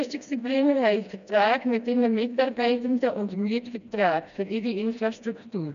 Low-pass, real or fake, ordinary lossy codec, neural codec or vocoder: 7.2 kHz; fake; none; codec, 16 kHz, 1 kbps, FreqCodec, smaller model